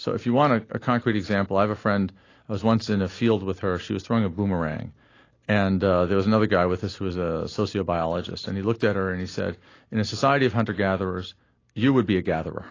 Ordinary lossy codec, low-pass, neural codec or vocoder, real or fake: AAC, 32 kbps; 7.2 kHz; none; real